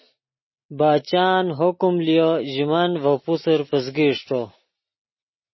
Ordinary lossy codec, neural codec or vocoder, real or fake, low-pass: MP3, 24 kbps; none; real; 7.2 kHz